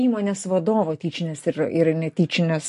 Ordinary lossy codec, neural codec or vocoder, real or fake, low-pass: MP3, 48 kbps; none; real; 14.4 kHz